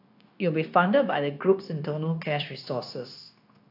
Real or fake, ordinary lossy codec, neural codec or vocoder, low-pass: fake; AAC, 32 kbps; codec, 16 kHz, 0.9 kbps, LongCat-Audio-Codec; 5.4 kHz